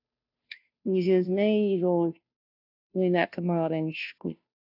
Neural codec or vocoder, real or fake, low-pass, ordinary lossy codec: codec, 16 kHz, 0.5 kbps, FunCodec, trained on Chinese and English, 25 frames a second; fake; 5.4 kHz; AAC, 48 kbps